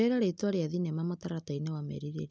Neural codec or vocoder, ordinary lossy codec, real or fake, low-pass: none; none; real; none